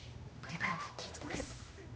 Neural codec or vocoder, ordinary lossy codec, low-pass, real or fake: codec, 16 kHz, 1 kbps, X-Codec, HuBERT features, trained on general audio; none; none; fake